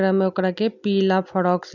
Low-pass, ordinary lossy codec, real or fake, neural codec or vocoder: 7.2 kHz; none; real; none